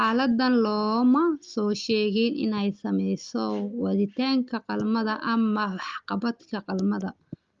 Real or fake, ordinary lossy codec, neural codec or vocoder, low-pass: real; Opus, 32 kbps; none; 7.2 kHz